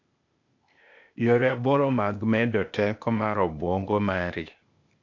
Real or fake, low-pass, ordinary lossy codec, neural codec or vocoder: fake; 7.2 kHz; MP3, 48 kbps; codec, 16 kHz, 0.8 kbps, ZipCodec